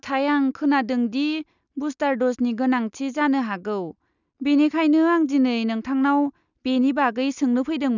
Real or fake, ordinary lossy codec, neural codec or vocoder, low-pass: real; none; none; 7.2 kHz